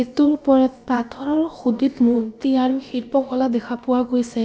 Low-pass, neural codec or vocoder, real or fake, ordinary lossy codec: none; codec, 16 kHz, about 1 kbps, DyCAST, with the encoder's durations; fake; none